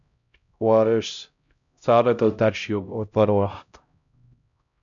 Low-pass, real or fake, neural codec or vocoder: 7.2 kHz; fake; codec, 16 kHz, 0.5 kbps, X-Codec, HuBERT features, trained on LibriSpeech